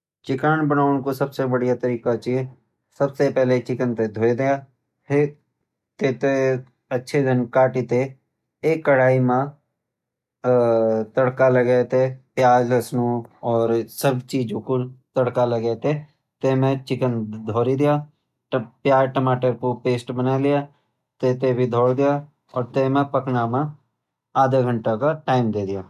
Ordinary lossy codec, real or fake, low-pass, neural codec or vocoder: none; real; 14.4 kHz; none